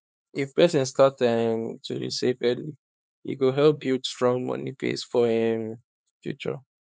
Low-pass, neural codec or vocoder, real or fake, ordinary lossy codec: none; codec, 16 kHz, 4 kbps, X-Codec, HuBERT features, trained on LibriSpeech; fake; none